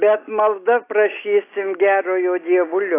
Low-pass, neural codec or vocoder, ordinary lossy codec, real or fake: 3.6 kHz; none; AAC, 24 kbps; real